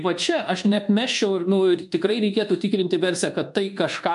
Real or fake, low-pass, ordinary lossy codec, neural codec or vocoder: fake; 10.8 kHz; MP3, 64 kbps; codec, 24 kHz, 1.2 kbps, DualCodec